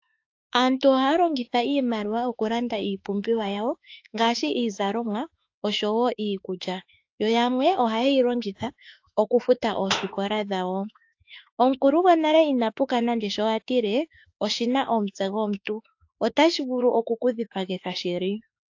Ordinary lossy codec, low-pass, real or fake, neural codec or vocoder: AAC, 48 kbps; 7.2 kHz; fake; autoencoder, 48 kHz, 32 numbers a frame, DAC-VAE, trained on Japanese speech